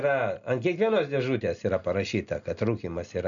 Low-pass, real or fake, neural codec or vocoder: 7.2 kHz; real; none